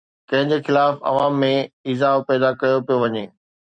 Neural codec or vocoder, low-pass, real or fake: vocoder, 44.1 kHz, 128 mel bands every 256 samples, BigVGAN v2; 9.9 kHz; fake